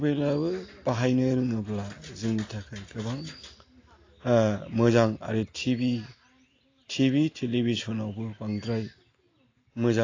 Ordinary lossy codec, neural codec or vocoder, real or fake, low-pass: AAC, 32 kbps; none; real; 7.2 kHz